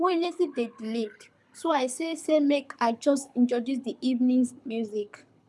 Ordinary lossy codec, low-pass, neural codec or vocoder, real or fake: none; none; codec, 24 kHz, 6 kbps, HILCodec; fake